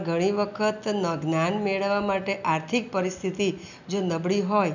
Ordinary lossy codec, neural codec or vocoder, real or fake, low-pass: none; none; real; 7.2 kHz